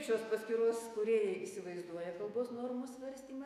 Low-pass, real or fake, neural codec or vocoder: 14.4 kHz; fake; autoencoder, 48 kHz, 128 numbers a frame, DAC-VAE, trained on Japanese speech